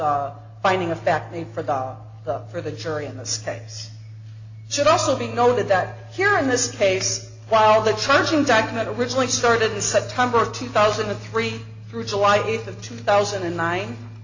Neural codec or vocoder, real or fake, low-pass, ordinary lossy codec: none; real; 7.2 kHz; AAC, 48 kbps